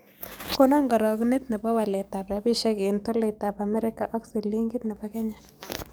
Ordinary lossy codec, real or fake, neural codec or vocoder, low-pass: none; fake; codec, 44.1 kHz, 7.8 kbps, DAC; none